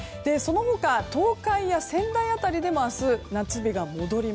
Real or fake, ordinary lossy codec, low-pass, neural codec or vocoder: real; none; none; none